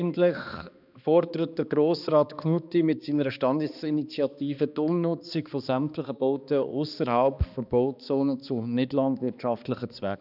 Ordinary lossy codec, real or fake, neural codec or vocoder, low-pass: none; fake; codec, 16 kHz, 2 kbps, X-Codec, HuBERT features, trained on balanced general audio; 5.4 kHz